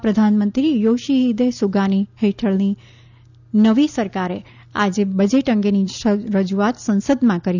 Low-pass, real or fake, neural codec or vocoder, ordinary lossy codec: 7.2 kHz; real; none; none